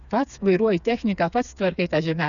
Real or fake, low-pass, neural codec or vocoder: fake; 7.2 kHz; codec, 16 kHz, 4 kbps, FreqCodec, smaller model